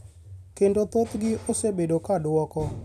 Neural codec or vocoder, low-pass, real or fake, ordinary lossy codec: vocoder, 44.1 kHz, 128 mel bands every 256 samples, BigVGAN v2; 14.4 kHz; fake; none